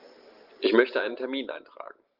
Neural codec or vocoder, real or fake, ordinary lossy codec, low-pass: none; real; Opus, 32 kbps; 5.4 kHz